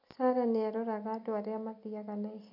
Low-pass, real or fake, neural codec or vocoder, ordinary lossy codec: 5.4 kHz; real; none; none